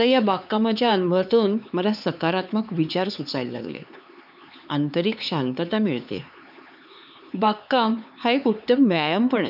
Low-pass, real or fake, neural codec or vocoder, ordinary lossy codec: 5.4 kHz; fake; codec, 16 kHz, 4 kbps, X-Codec, HuBERT features, trained on LibriSpeech; none